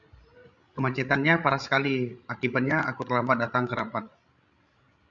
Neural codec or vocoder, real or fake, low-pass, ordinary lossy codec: codec, 16 kHz, 16 kbps, FreqCodec, larger model; fake; 7.2 kHz; MP3, 64 kbps